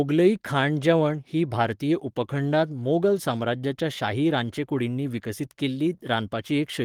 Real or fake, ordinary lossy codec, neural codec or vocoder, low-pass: fake; Opus, 24 kbps; codec, 44.1 kHz, 7.8 kbps, Pupu-Codec; 19.8 kHz